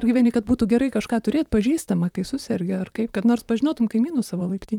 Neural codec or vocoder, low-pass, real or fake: vocoder, 44.1 kHz, 128 mel bands, Pupu-Vocoder; 19.8 kHz; fake